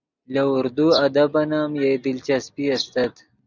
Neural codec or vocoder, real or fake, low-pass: none; real; 7.2 kHz